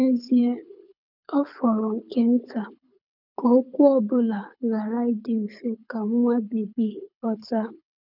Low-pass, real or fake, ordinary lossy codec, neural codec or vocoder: 5.4 kHz; fake; none; codec, 24 kHz, 6 kbps, HILCodec